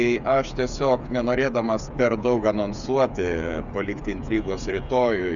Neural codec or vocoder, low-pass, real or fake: codec, 16 kHz, 8 kbps, FreqCodec, smaller model; 7.2 kHz; fake